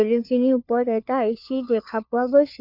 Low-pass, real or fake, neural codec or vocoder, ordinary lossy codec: 5.4 kHz; fake; codec, 16 kHz, 4 kbps, FunCodec, trained on LibriTTS, 50 frames a second; none